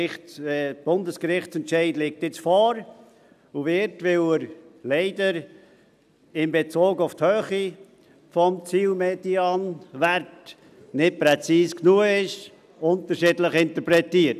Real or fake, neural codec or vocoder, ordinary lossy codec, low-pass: real; none; none; 14.4 kHz